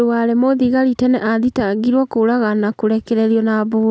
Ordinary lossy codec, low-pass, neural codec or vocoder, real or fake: none; none; none; real